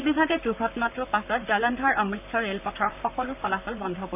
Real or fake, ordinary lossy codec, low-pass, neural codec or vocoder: fake; none; 3.6 kHz; vocoder, 44.1 kHz, 128 mel bands, Pupu-Vocoder